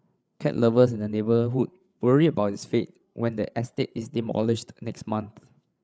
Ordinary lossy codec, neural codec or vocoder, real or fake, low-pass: none; codec, 16 kHz, 8 kbps, FreqCodec, larger model; fake; none